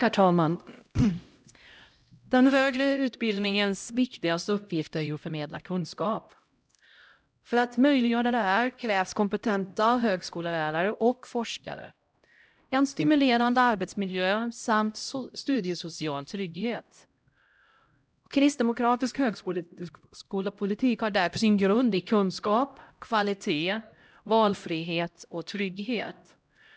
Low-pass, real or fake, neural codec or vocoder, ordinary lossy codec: none; fake; codec, 16 kHz, 0.5 kbps, X-Codec, HuBERT features, trained on LibriSpeech; none